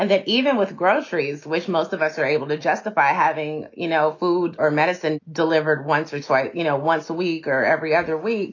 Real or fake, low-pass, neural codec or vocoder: real; 7.2 kHz; none